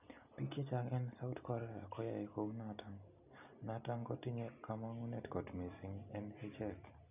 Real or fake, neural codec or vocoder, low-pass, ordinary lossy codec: real; none; 3.6 kHz; none